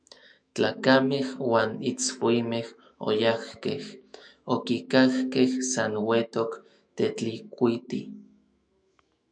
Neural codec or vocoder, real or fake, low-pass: autoencoder, 48 kHz, 128 numbers a frame, DAC-VAE, trained on Japanese speech; fake; 9.9 kHz